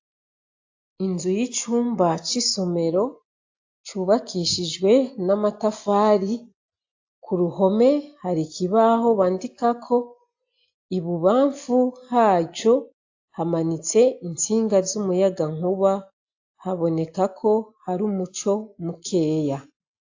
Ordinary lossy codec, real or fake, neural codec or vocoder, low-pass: AAC, 48 kbps; real; none; 7.2 kHz